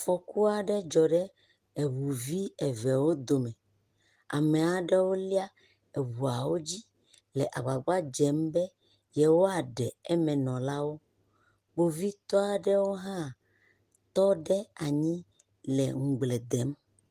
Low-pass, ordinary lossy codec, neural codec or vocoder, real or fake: 14.4 kHz; Opus, 24 kbps; none; real